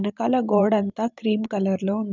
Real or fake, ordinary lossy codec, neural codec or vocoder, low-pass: fake; none; vocoder, 44.1 kHz, 128 mel bands every 512 samples, BigVGAN v2; 7.2 kHz